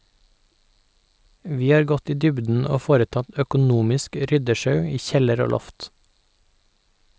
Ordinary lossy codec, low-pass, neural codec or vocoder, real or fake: none; none; none; real